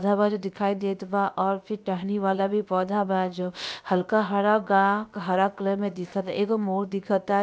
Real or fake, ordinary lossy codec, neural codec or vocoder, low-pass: fake; none; codec, 16 kHz, about 1 kbps, DyCAST, with the encoder's durations; none